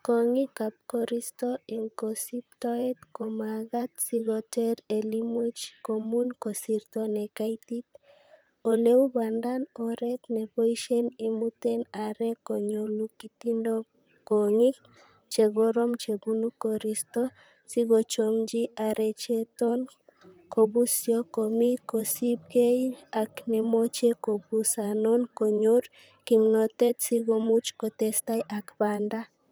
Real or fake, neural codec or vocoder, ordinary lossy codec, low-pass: fake; vocoder, 44.1 kHz, 128 mel bands, Pupu-Vocoder; none; none